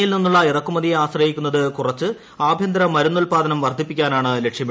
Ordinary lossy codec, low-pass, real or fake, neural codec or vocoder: none; none; real; none